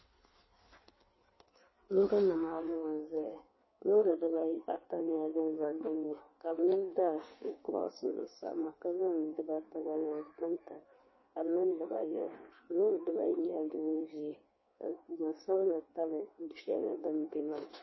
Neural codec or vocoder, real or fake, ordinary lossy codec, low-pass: codec, 16 kHz in and 24 kHz out, 1.1 kbps, FireRedTTS-2 codec; fake; MP3, 24 kbps; 7.2 kHz